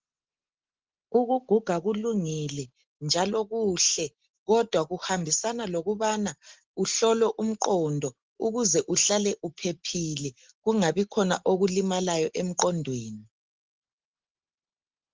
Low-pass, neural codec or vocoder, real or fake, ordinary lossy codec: 7.2 kHz; none; real; Opus, 16 kbps